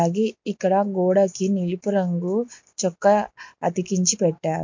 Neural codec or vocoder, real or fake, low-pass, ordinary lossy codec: none; real; 7.2 kHz; MP3, 48 kbps